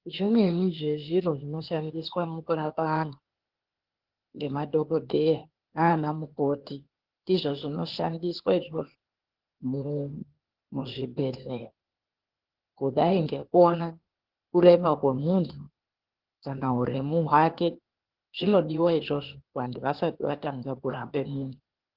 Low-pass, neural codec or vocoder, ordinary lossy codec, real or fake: 5.4 kHz; codec, 16 kHz, 0.8 kbps, ZipCodec; Opus, 16 kbps; fake